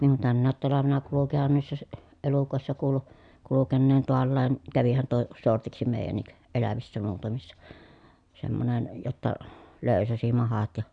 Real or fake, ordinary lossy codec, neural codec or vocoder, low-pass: real; none; none; 9.9 kHz